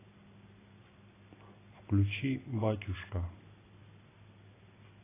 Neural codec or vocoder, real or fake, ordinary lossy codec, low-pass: none; real; AAC, 16 kbps; 3.6 kHz